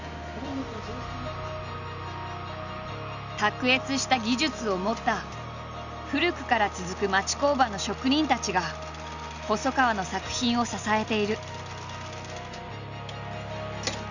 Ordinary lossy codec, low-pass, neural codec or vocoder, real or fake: none; 7.2 kHz; none; real